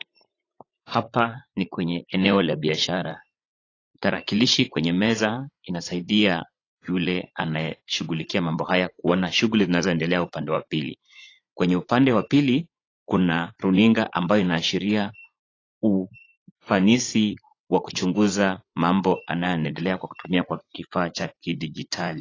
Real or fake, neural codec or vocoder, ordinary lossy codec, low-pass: fake; vocoder, 44.1 kHz, 80 mel bands, Vocos; AAC, 32 kbps; 7.2 kHz